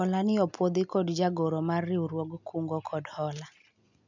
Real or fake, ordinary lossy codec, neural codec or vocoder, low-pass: real; none; none; 7.2 kHz